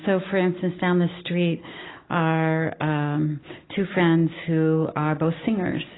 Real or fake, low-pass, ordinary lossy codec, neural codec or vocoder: real; 7.2 kHz; AAC, 16 kbps; none